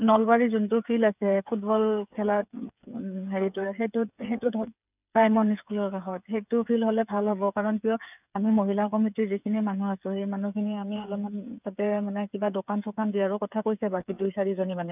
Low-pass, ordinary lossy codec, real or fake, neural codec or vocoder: 3.6 kHz; none; fake; codec, 16 kHz, 8 kbps, FreqCodec, smaller model